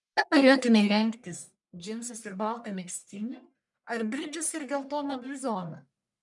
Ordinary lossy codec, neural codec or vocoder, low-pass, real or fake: MP3, 96 kbps; codec, 44.1 kHz, 1.7 kbps, Pupu-Codec; 10.8 kHz; fake